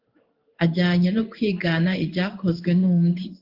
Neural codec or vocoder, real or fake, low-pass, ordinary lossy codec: codec, 16 kHz in and 24 kHz out, 1 kbps, XY-Tokenizer; fake; 5.4 kHz; Opus, 32 kbps